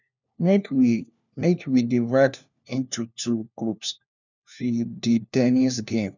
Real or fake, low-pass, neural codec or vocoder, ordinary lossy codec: fake; 7.2 kHz; codec, 16 kHz, 1 kbps, FunCodec, trained on LibriTTS, 50 frames a second; none